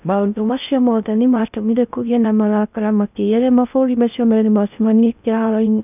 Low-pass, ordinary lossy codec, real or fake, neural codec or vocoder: 3.6 kHz; none; fake; codec, 16 kHz in and 24 kHz out, 0.6 kbps, FocalCodec, streaming, 2048 codes